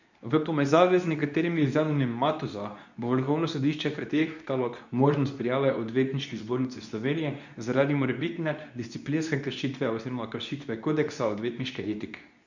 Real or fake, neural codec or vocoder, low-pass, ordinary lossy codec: fake; codec, 24 kHz, 0.9 kbps, WavTokenizer, medium speech release version 2; 7.2 kHz; none